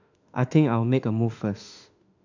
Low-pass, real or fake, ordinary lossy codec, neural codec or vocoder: 7.2 kHz; fake; none; autoencoder, 48 kHz, 32 numbers a frame, DAC-VAE, trained on Japanese speech